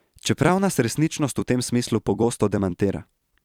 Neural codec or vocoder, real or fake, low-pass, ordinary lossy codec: vocoder, 44.1 kHz, 128 mel bands every 256 samples, BigVGAN v2; fake; 19.8 kHz; none